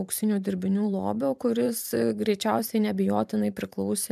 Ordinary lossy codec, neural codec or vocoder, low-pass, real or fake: MP3, 96 kbps; vocoder, 44.1 kHz, 128 mel bands every 512 samples, BigVGAN v2; 14.4 kHz; fake